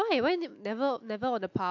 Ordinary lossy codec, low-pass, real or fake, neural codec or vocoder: AAC, 48 kbps; 7.2 kHz; real; none